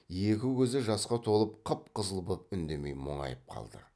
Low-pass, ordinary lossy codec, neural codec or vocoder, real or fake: none; none; none; real